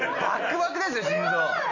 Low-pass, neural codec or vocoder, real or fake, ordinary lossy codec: 7.2 kHz; none; real; none